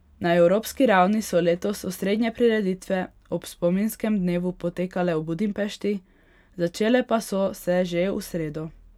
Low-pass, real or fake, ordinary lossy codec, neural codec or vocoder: 19.8 kHz; real; none; none